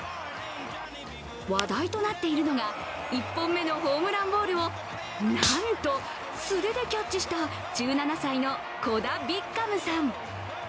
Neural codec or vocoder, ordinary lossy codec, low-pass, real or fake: none; none; none; real